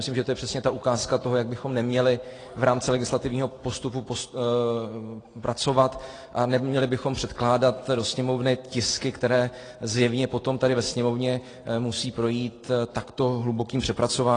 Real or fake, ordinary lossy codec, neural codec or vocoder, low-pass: real; AAC, 32 kbps; none; 9.9 kHz